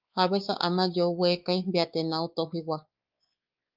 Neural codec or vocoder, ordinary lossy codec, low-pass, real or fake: codec, 16 kHz, 4 kbps, X-Codec, WavLM features, trained on Multilingual LibriSpeech; Opus, 24 kbps; 5.4 kHz; fake